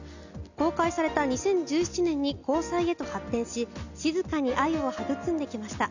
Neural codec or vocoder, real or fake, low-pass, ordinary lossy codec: none; real; 7.2 kHz; none